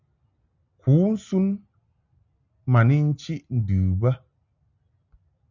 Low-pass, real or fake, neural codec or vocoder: 7.2 kHz; real; none